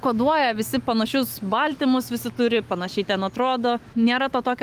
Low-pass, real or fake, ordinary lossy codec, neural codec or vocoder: 14.4 kHz; fake; Opus, 24 kbps; autoencoder, 48 kHz, 128 numbers a frame, DAC-VAE, trained on Japanese speech